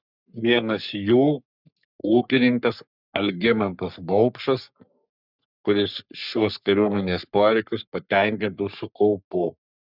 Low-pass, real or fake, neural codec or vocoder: 5.4 kHz; fake; codec, 44.1 kHz, 3.4 kbps, Pupu-Codec